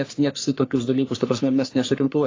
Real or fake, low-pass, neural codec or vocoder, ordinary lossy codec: fake; 7.2 kHz; codec, 44.1 kHz, 3.4 kbps, Pupu-Codec; AAC, 32 kbps